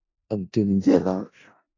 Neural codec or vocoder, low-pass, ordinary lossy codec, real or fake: codec, 16 kHz in and 24 kHz out, 0.4 kbps, LongCat-Audio-Codec, four codebook decoder; 7.2 kHz; AAC, 32 kbps; fake